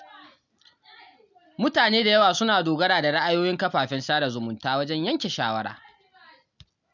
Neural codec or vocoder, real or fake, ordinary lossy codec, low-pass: none; real; none; 7.2 kHz